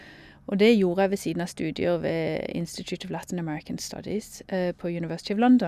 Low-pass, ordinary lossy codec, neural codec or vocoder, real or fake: 14.4 kHz; none; none; real